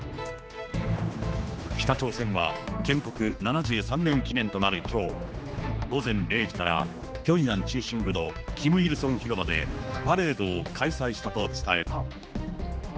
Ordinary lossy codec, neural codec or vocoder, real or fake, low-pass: none; codec, 16 kHz, 2 kbps, X-Codec, HuBERT features, trained on general audio; fake; none